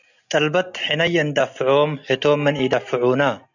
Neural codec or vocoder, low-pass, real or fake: none; 7.2 kHz; real